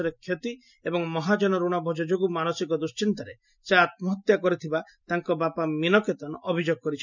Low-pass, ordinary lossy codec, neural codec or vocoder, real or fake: 7.2 kHz; none; none; real